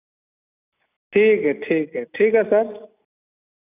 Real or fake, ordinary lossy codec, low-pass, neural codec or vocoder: real; none; 3.6 kHz; none